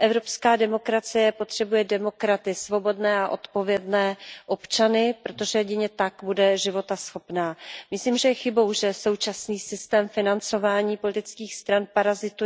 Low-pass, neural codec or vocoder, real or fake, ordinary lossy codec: none; none; real; none